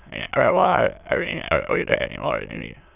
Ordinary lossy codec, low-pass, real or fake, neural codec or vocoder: none; 3.6 kHz; fake; autoencoder, 22.05 kHz, a latent of 192 numbers a frame, VITS, trained on many speakers